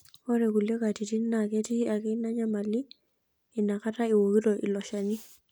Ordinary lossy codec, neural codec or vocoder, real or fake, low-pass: none; none; real; none